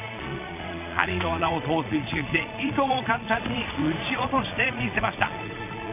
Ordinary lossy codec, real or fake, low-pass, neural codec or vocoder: none; fake; 3.6 kHz; vocoder, 22.05 kHz, 80 mel bands, Vocos